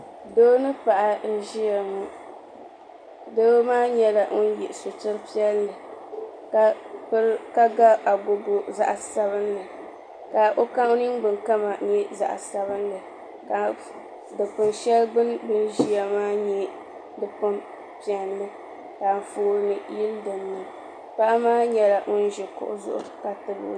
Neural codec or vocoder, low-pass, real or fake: none; 9.9 kHz; real